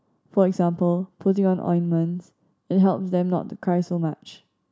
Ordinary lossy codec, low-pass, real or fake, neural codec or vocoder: none; none; real; none